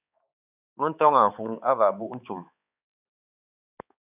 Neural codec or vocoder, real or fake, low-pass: codec, 16 kHz, 4 kbps, X-Codec, HuBERT features, trained on balanced general audio; fake; 3.6 kHz